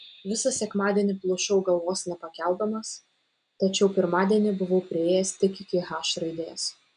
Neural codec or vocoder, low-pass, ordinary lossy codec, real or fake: none; 9.9 kHz; MP3, 96 kbps; real